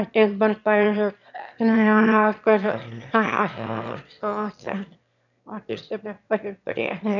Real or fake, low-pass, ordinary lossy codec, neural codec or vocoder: fake; 7.2 kHz; none; autoencoder, 22.05 kHz, a latent of 192 numbers a frame, VITS, trained on one speaker